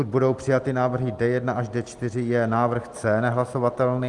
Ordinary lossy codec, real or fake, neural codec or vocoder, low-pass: Opus, 24 kbps; real; none; 10.8 kHz